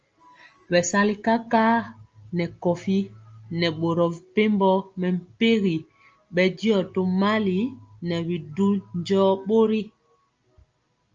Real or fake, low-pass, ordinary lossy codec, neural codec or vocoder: real; 7.2 kHz; Opus, 24 kbps; none